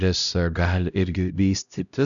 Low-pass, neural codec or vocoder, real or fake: 7.2 kHz; codec, 16 kHz, 0.5 kbps, X-Codec, HuBERT features, trained on LibriSpeech; fake